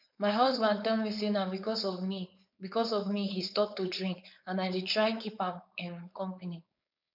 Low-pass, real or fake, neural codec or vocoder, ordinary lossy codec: 5.4 kHz; fake; codec, 16 kHz, 4.8 kbps, FACodec; none